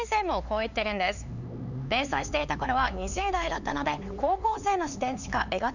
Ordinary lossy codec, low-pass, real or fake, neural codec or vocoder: none; 7.2 kHz; fake; codec, 16 kHz, 4 kbps, X-Codec, HuBERT features, trained on LibriSpeech